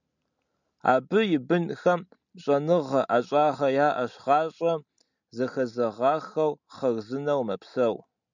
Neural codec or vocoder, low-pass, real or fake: none; 7.2 kHz; real